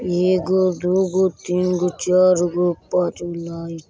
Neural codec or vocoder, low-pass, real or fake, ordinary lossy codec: none; none; real; none